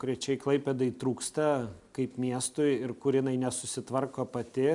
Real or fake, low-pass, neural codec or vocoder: real; 10.8 kHz; none